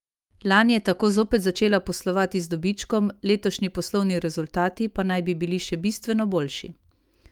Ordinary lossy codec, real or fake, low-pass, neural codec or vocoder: Opus, 32 kbps; fake; 19.8 kHz; autoencoder, 48 kHz, 128 numbers a frame, DAC-VAE, trained on Japanese speech